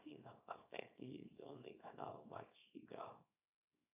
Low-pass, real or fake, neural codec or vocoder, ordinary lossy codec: 3.6 kHz; fake; codec, 24 kHz, 0.9 kbps, WavTokenizer, small release; AAC, 32 kbps